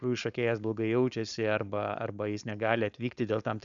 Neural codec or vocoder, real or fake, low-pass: codec, 16 kHz, 4.8 kbps, FACodec; fake; 7.2 kHz